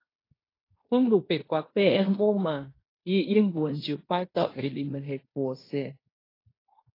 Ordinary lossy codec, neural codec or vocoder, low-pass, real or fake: AAC, 24 kbps; codec, 16 kHz in and 24 kHz out, 0.9 kbps, LongCat-Audio-Codec, fine tuned four codebook decoder; 5.4 kHz; fake